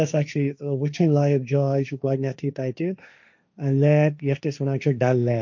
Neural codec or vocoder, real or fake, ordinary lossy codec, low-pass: codec, 16 kHz, 1.1 kbps, Voila-Tokenizer; fake; none; 7.2 kHz